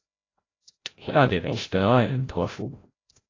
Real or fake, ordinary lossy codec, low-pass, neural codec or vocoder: fake; AAC, 32 kbps; 7.2 kHz; codec, 16 kHz, 0.5 kbps, FreqCodec, larger model